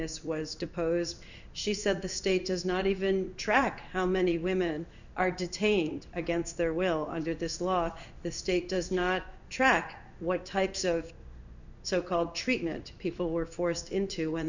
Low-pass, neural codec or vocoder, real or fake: 7.2 kHz; codec, 16 kHz in and 24 kHz out, 1 kbps, XY-Tokenizer; fake